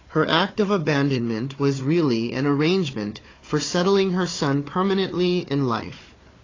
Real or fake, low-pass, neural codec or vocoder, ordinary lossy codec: fake; 7.2 kHz; codec, 16 kHz, 4 kbps, FunCodec, trained on Chinese and English, 50 frames a second; AAC, 32 kbps